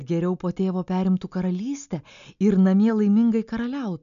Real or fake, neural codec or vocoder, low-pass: real; none; 7.2 kHz